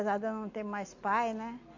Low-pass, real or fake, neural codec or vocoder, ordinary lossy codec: 7.2 kHz; real; none; AAC, 48 kbps